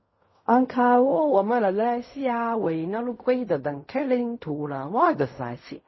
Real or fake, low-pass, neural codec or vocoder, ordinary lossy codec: fake; 7.2 kHz; codec, 16 kHz in and 24 kHz out, 0.4 kbps, LongCat-Audio-Codec, fine tuned four codebook decoder; MP3, 24 kbps